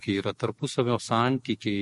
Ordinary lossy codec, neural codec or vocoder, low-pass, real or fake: MP3, 48 kbps; codec, 44.1 kHz, 7.8 kbps, DAC; 14.4 kHz; fake